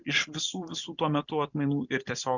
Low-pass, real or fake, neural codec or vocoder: 7.2 kHz; real; none